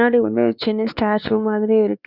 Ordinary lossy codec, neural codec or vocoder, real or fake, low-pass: Opus, 64 kbps; codec, 16 kHz, 2 kbps, X-Codec, WavLM features, trained on Multilingual LibriSpeech; fake; 5.4 kHz